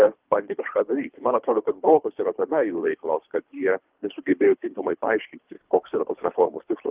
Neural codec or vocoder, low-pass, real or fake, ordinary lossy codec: codec, 24 kHz, 3 kbps, HILCodec; 3.6 kHz; fake; Opus, 32 kbps